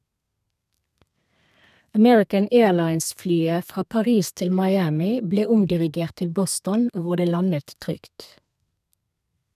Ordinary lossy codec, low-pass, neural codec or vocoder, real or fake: none; 14.4 kHz; codec, 32 kHz, 1.9 kbps, SNAC; fake